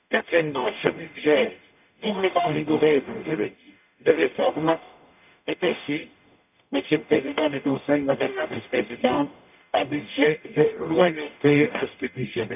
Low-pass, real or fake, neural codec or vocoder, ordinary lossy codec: 3.6 kHz; fake; codec, 44.1 kHz, 0.9 kbps, DAC; none